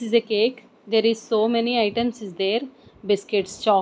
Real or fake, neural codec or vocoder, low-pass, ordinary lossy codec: real; none; none; none